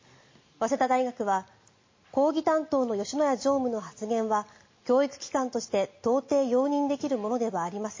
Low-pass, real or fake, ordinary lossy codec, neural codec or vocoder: 7.2 kHz; real; MP3, 32 kbps; none